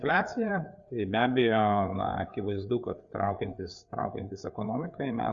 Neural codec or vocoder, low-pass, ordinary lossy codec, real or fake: codec, 16 kHz, 4 kbps, FreqCodec, larger model; 7.2 kHz; Opus, 64 kbps; fake